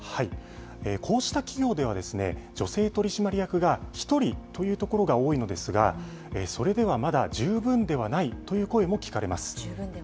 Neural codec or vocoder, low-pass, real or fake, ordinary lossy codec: none; none; real; none